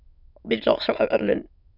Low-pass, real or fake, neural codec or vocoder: 5.4 kHz; fake; autoencoder, 22.05 kHz, a latent of 192 numbers a frame, VITS, trained on many speakers